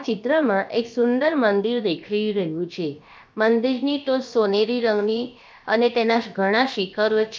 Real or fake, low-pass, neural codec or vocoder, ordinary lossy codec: fake; none; codec, 16 kHz, about 1 kbps, DyCAST, with the encoder's durations; none